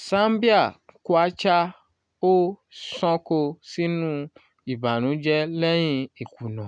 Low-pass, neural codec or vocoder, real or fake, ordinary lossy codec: 9.9 kHz; none; real; none